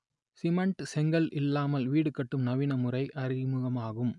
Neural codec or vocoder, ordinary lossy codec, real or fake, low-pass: none; none; real; 10.8 kHz